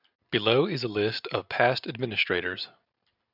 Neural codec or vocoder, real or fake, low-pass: none; real; 5.4 kHz